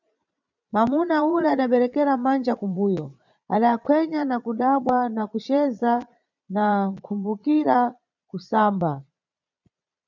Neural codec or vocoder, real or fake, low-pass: vocoder, 22.05 kHz, 80 mel bands, Vocos; fake; 7.2 kHz